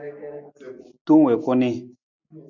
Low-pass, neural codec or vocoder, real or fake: 7.2 kHz; none; real